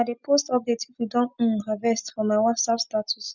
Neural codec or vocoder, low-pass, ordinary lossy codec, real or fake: none; 7.2 kHz; none; real